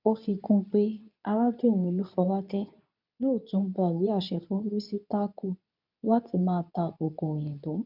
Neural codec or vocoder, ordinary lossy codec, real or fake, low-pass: codec, 24 kHz, 0.9 kbps, WavTokenizer, medium speech release version 2; none; fake; 5.4 kHz